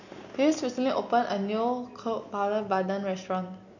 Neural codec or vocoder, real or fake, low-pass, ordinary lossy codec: none; real; 7.2 kHz; none